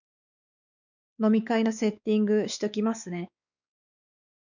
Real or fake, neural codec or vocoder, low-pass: fake; codec, 16 kHz, 4 kbps, X-Codec, WavLM features, trained on Multilingual LibriSpeech; 7.2 kHz